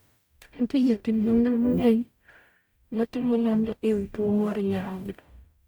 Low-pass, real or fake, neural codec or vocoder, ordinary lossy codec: none; fake; codec, 44.1 kHz, 0.9 kbps, DAC; none